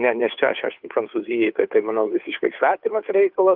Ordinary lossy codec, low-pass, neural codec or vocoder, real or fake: Opus, 24 kbps; 5.4 kHz; codec, 16 kHz, 2 kbps, FunCodec, trained on Chinese and English, 25 frames a second; fake